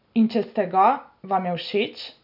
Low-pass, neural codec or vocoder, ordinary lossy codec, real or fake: 5.4 kHz; none; none; real